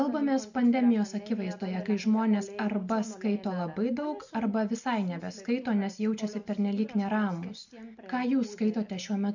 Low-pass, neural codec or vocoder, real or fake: 7.2 kHz; none; real